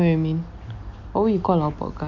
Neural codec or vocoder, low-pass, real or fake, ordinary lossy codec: none; 7.2 kHz; real; none